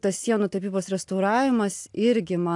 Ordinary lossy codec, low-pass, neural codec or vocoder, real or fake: AAC, 64 kbps; 10.8 kHz; none; real